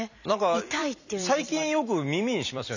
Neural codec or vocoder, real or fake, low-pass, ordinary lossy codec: vocoder, 44.1 kHz, 128 mel bands every 256 samples, BigVGAN v2; fake; 7.2 kHz; none